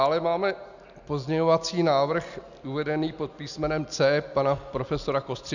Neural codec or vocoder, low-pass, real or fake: none; 7.2 kHz; real